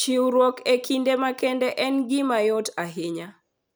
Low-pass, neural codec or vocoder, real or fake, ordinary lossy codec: none; none; real; none